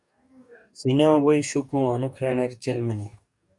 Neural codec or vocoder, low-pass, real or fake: codec, 44.1 kHz, 2.6 kbps, DAC; 10.8 kHz; fake